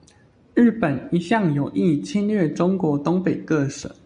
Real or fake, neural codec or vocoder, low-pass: real; none; 9.9 kHz